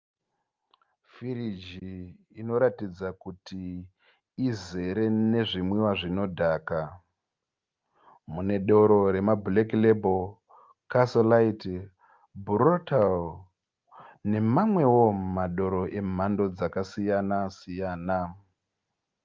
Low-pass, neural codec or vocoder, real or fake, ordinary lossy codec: 7.2 kHz; none; real; Opus, 24 kbps